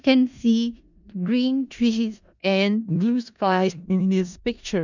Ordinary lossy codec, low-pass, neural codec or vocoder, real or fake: none; 7.2 kHz; codec, 16 kHz in and 24 kHz out, 0.4 kbps, LongCat-Audio-Codec, four codebook decoder; fake